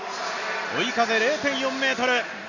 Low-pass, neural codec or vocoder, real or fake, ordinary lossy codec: 7.2 kHz; none; real; none